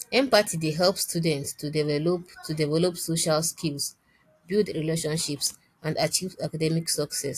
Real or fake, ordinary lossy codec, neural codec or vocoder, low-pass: real; AAC, 64 kbps; none; 14.4 kHz